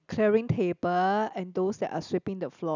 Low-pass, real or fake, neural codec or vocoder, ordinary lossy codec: 7.2 kHz; real; none; none